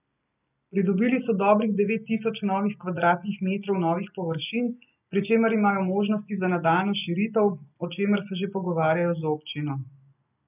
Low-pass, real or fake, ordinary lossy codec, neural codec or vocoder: 3.6 kHz; real; none; none